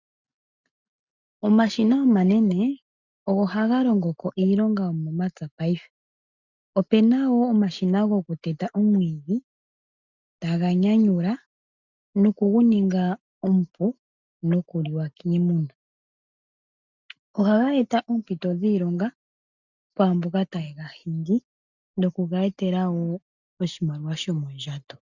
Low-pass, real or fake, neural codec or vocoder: 7.2 kHz; real; none